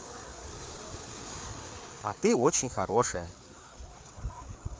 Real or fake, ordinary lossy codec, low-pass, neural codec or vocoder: fake; none; none; codec, 16 kHz, 4 kbps, FreqCodec, larger model